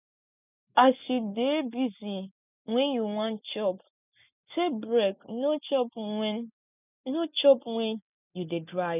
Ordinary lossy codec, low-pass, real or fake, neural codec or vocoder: none; 3.6 kHz; real; none